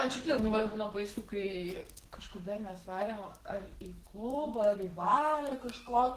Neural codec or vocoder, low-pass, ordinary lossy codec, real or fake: codec, 32 kHz, 1.9 kbps, SNAC; 14.4 kHz; Opus, 16 kbps; fake